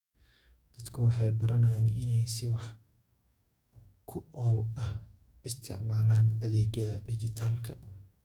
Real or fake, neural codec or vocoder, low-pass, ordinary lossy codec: fake; codec, 44.1 kHz, 2.6 kbps, DAC; 19.8 kHz; none